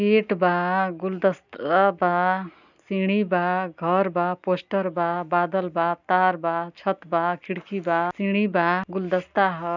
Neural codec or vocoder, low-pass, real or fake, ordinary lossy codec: none; 7.2 kHz; real; none